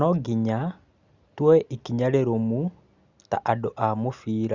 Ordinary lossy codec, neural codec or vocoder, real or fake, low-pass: Opus, 64 kbps; none; real; 7.2 kHz